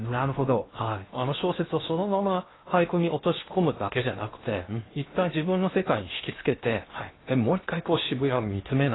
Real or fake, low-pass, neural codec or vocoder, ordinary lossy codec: fake; 7.2 kHz; codec, 16 kHz in and 24 kHz out, 0.8 kbps, FocalCodec, streaming, 65536 codes; AAC, 16 kbps